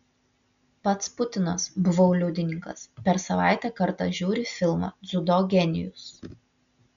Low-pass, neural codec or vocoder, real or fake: 7.2 kHz; none; real